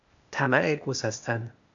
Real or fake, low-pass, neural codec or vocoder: fake; 7.2 kHz; codec, 16 kHz, 0.8 kbps, ZipCodec